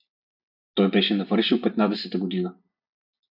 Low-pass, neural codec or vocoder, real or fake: 5.4 kHz; none; real